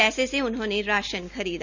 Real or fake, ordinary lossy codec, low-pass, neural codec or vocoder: real; Opus, 64 kbps; 7.2 kHz; none